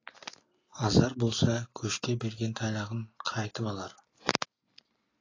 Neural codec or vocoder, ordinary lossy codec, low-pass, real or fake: none; AAC, 32 kbps; 7.2 kHz; real